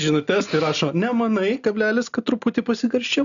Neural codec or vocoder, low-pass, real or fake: none; 7.2 kHz; real